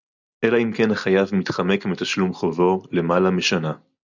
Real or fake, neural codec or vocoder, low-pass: real; none; 7.2 kHz